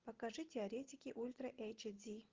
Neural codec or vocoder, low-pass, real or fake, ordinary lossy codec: none; 7.2 kHz; real; Opus, 16 kbps